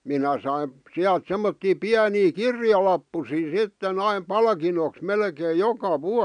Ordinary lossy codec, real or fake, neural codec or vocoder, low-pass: none; real; none; 9.9 kHz